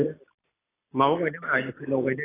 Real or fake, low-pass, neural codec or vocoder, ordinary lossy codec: real; 3.6 kHz; none; AAC, 16 kbps